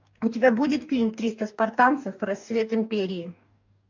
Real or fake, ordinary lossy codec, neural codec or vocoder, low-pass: fake; MP3, 64 kbps; codec, 44.1 kHz, 2.6 kbps, DAC; 7.2 kHz